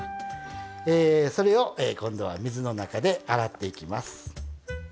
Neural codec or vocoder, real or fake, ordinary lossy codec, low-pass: none; real; none; none